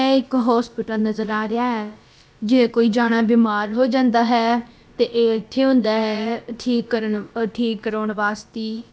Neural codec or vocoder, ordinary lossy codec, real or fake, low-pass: codec, 16 kHz, about 1 kbps, DyCAST, with the encoder's durations; none; fake; none